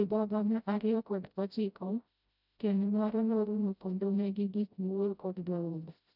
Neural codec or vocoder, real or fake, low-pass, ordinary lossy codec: codec, 16 kHz, 0.5 kbps, FreqCodec, smaller model; fake; 5.4 kHz; none